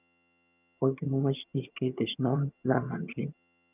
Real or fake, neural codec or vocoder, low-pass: fake; vocoder, 22.05 kHz, 80 mel bands, HiFi-GAN; 3.6 kHz